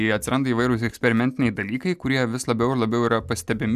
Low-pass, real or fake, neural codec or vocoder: 14.4 kHz; real; none